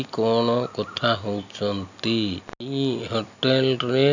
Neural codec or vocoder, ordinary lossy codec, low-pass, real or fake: none; none; 7.2 kHz; real